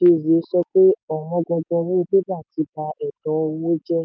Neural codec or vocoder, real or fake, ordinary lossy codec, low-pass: none; real; none; none